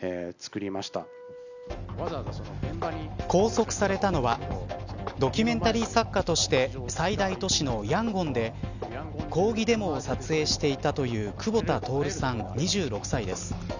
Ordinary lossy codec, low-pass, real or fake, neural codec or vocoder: none; 7.2 kHz; real; none